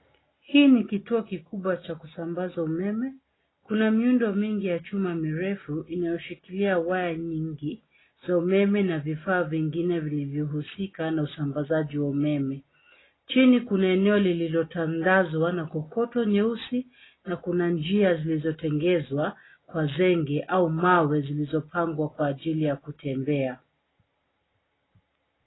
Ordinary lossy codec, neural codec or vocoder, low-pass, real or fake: AAC, 16 kbps; none; 7.2 kHz; real